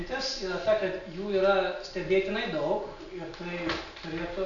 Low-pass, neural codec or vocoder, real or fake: 7.2 kHz; none; real